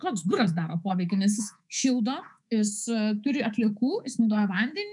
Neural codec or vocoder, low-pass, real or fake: codec, 24 kHz, 3.1 kbps, DualCodec; 10.8 kHz; fake